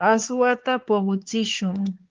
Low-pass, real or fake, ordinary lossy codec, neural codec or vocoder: 7.2 kHz; fake; Opus, 16 kbps; codec, 16 kHz, 4 kbps, X-Codec, HuBERT features, trained on LibriSpeech